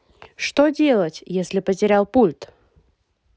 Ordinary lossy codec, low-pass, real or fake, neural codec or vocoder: none; none; real; none